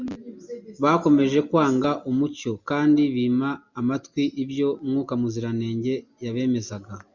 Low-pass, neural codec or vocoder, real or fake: 7.2 kHz; none; real